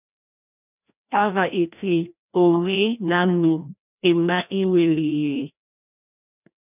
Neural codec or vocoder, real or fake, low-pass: codec, 16 kHz, 1 kbps, FreqCodec, larger model; fake; 3.6 kHz